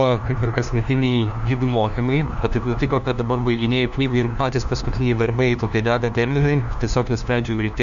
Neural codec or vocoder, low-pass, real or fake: codec, 16 kHz, 1 kbps, FunCodec, trained on LibriTTS, 50 frames a second; 7.2 kHz; fake